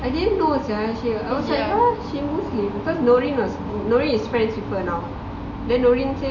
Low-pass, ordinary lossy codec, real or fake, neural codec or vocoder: 7.2 kHz; none; real; none